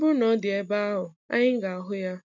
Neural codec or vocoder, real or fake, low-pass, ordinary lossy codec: none; real; 7.2 kHz; none